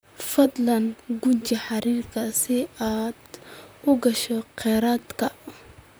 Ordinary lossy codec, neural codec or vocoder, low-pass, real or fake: none; vocoder, 44.1 kHz, 128 mel bands, Pupu-Vocoder; none; fake